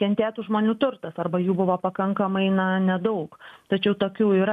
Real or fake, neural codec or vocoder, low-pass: real; none; 14.4 kHz